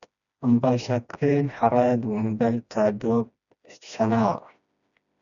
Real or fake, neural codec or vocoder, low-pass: fake; codec, 16 kHz, 1 kbps, FreqCodec, smaller model; 7.2 kHz